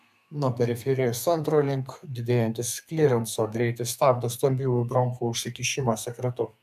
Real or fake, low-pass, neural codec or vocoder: fake; 14.4 kHz; codec, 32 kHz, 1.9 kbps, SNAC